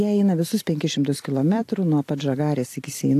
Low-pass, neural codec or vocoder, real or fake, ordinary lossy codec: 14.4 kHz; vocoder, 44.1 kHz, 128 mel bands every 256 samples, BigVGAN v2; fake; AAC, 64 kbps